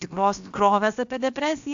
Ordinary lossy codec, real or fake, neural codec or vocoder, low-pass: AAC, 64 kbps; fake; codec, 16 kHz, about 1 kbps, DyCAST, with the encoder's durations; 7.2 kHz